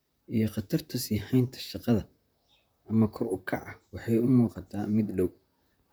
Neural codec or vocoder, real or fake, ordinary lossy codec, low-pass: vocoder, 44.1 kHz, 128 mel bands, Pupu-Vocoder; fake; none; none